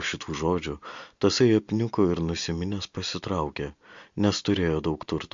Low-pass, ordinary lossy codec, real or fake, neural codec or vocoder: 7.2 kHz; MP3, 48 kbps; real; none